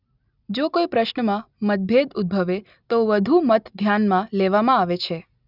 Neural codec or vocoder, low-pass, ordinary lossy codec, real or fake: none; 5.4 kHz; none; real